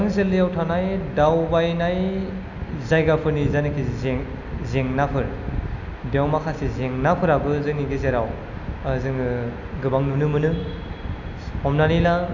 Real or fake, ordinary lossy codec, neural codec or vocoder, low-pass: real; none; none; 7.2 kHz